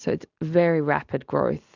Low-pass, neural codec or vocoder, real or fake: 7.2 kHz; none; real